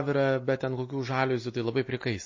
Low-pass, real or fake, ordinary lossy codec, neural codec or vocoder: 7.2 kHz; real; MP3, 32 kbps; none